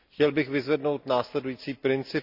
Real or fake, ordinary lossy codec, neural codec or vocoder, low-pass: real; none; none; 5.4 kHz